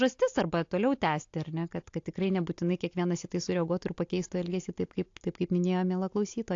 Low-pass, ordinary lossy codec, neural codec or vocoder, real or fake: 7.2 kHz; AAC, 48 kbps; none; real